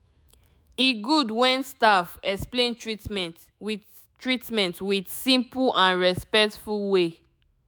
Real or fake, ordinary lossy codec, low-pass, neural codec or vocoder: fake; none; none; autoencoder, 48 kHz, 128 numbers a frame, DAC-VAE, trained on Japanese speech